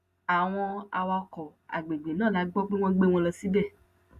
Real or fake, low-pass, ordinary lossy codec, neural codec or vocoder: real; 14.4 kHz; Opus, 64 kbps; none